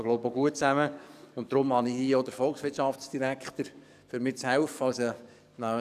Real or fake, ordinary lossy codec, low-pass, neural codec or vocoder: fake; none; 14.4 kHz; codec, 44.1 kHz, 7.8 kbps, DAC